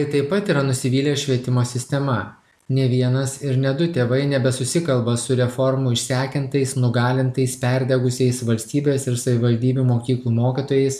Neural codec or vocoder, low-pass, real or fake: none; 14.4 kHz; real